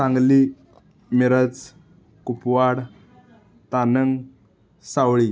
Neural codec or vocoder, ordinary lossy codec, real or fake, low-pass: none; none; real; none